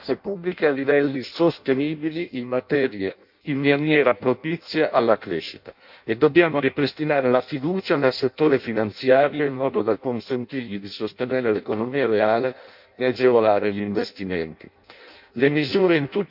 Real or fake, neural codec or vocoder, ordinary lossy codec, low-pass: fake; codec, 16 kHz in and 24 kHz out, 0.6 kbps, FireRedTTS-2 codec; MP3, 48 kbps; 5.4 kHz